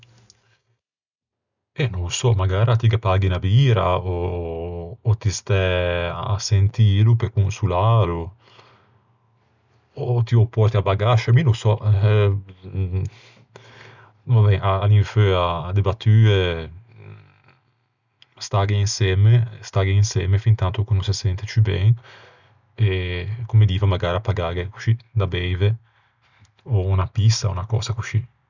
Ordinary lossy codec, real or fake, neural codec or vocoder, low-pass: none; real; none; 7.2 kHz